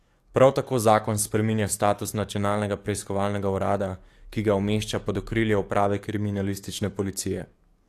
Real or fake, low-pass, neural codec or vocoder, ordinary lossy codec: fake; 14.4 kHz; codec, 44.1 kHz, 7.8 kbps, DAC; AAC, 64 kbps